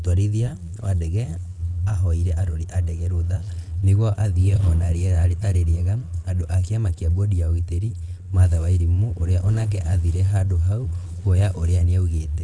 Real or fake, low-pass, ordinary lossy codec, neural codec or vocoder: fake; none; none; vocoder, 22.05 kHz, 80 mel bands, Vocos